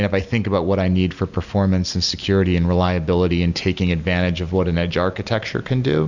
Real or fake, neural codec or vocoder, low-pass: real; none; 7.2 kHz